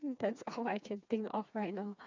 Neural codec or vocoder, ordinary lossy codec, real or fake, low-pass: codec, 16 kHz, 4 kbps, FreqCodec, smaller model; MP3, 64 kbps; fake; 7.2 kHz